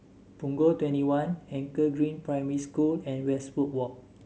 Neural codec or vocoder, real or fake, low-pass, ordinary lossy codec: none; real; none; none